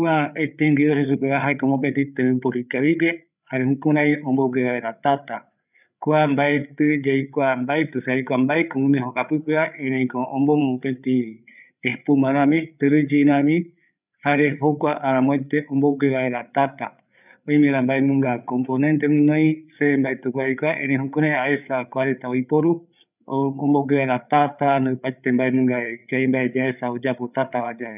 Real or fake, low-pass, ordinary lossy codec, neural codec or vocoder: fake; 3.6 kHz; none; codec, 16 kHz, 8 kbps, FreqCodec, larger model